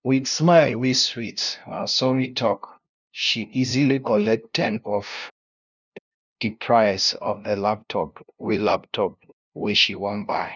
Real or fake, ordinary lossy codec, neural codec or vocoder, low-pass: fake; none; codec, 16 kHz, 0.5 kbps, FunCodec, trained on LibriTTS, 25 frames a second; 7.2 kHz